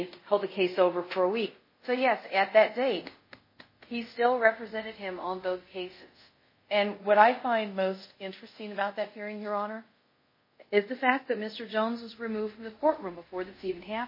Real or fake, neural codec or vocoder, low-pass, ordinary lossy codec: fake; codec, 24 kHz, 0.5 kbps, DualCodec; 5.4 kHz; MP3, 24 kbps